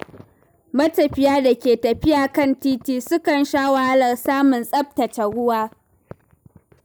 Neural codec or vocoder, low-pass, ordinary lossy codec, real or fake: none; none; none; real